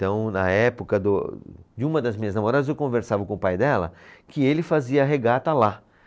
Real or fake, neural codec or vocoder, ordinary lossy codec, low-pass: real; none; none; none